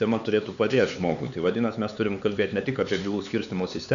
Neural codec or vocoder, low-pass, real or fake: codec, 16 kHz, 4 kbps, X-Codec, HuBERT features, trained on LibriSpeech; 7.2 kHz; fake